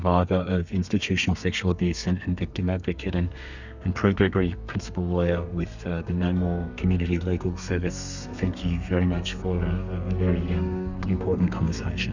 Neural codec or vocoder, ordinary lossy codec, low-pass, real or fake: codec, 32 kHz, 1.9 kbps, SNAC; Opus, 64 kbps; 7.2 kHz; fake